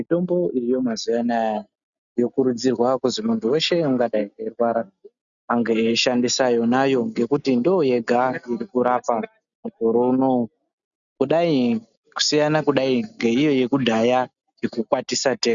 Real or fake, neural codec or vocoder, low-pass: real; none; 7.2 kHz